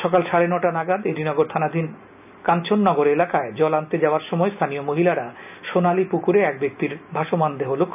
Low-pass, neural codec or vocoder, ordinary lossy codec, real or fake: 3.6 kHz; none; none; real